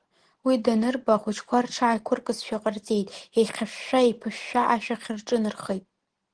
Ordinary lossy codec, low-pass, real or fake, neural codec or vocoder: Opus, 16 kbps; 9.9 kHz; real; none